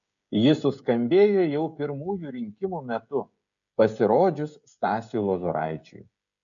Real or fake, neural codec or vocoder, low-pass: fake; codec, 16 kHz, 16 kbps, FreqCodec, smaller model; 7.2 kHz